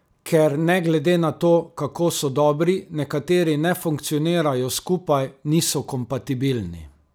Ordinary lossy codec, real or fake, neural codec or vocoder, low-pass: none; real; none; none